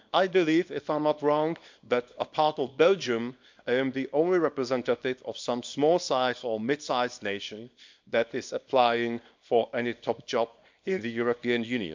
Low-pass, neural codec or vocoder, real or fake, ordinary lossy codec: 7.2 kHz; codec, 24 kHz, 0.9 kbps, WavTokenizer, small release; fake; MP3, 64 kbps